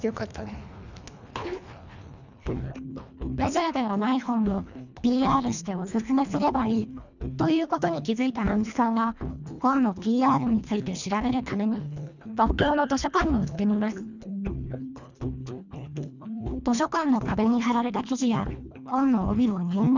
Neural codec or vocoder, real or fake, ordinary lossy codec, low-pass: codec, 24 kHz, 1.5 kbps, HILCodec; fake; none; 7.2 kHz